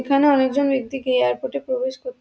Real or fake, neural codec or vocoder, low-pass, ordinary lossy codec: real; none; none; none